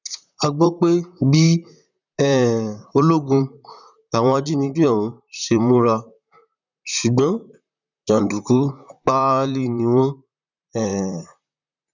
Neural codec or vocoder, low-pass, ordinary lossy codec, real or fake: vocoder, 44.1 kHz, 128 mel bands, Pupu-Vocoder; 7.2 kHz; none; fake